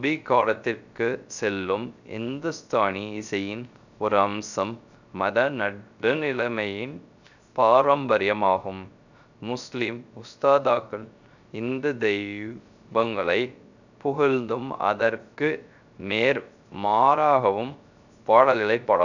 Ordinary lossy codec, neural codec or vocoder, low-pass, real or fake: none; codec, 16 kHz, 0.3 kbps, FocalCodec; 7.2 kHz; fake